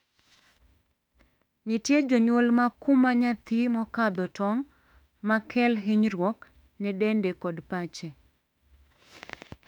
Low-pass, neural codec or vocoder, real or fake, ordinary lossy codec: 19.8 kHz; autoencoder, 48 kHz, 32 numbers a frame, DAC-VAE, trained on Japanese speech; fake; none